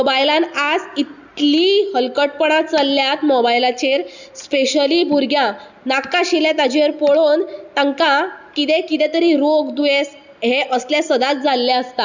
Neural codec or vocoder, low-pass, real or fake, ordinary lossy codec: none; 7.2 kHz; real; none